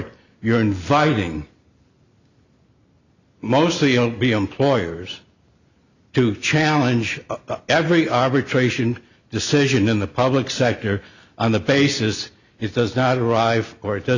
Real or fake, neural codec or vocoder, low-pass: real; none; 7.2 kHz